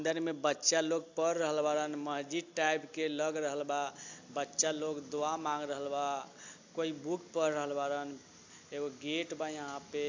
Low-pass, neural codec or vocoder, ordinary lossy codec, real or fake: 7.2 kHz; none; AAC, 48 kbps; real